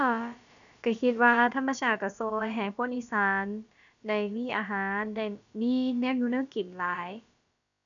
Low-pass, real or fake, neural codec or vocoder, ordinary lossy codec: 7.2 kHz; fake; codec, 16 kHz, about 1 kbps, DyCAST, with the encoder's durations; none